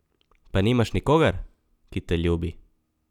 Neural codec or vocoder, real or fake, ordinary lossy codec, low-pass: none; real; none; 19.8 kHz